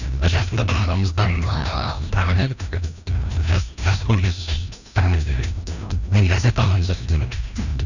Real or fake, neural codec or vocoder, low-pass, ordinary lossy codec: fake; codec, 16 kHz, 1 kbps, FreqCodec, larger model; 7.2 kHz; none